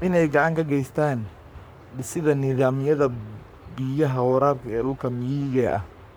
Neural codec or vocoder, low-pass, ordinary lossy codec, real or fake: codec, 44.1 kHz, 3.4 kbps, Pupu-Codec; none; none; fake